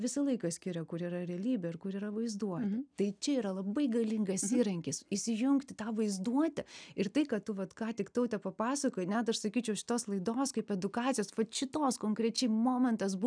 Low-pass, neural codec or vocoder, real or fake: 9.9 kHz; none; real